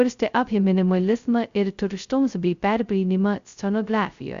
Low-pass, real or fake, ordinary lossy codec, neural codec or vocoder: 7.2 kHz; fake; Opus, 64 kbps; codec, 16 kHz, 0.2 kbps, FocalCodec